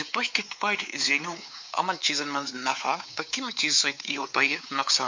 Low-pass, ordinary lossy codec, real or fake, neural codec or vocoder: 7.2 kHz; MP3, 48 kbps; fake; codec, 16 kHz, 4 kbps, X-Codec, WavLM features, trained on Multilingual LibriSpeech